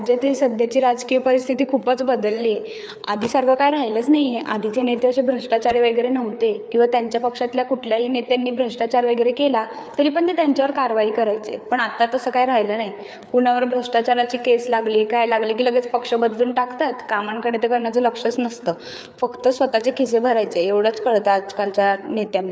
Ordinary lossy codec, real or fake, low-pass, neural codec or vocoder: none; fake; none; codec, 16 kHz, 4 kbps, FreqCodec, larger model